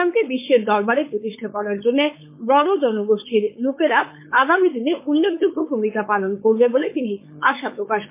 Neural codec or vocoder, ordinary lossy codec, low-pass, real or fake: codec, 16 kHz, 4.8 kbps, FACodec; MP3, 24 kbps; 3.6 kHz; fake